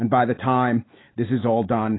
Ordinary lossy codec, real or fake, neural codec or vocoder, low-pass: AAC, 16 kbps; real; none; 7.2 kHz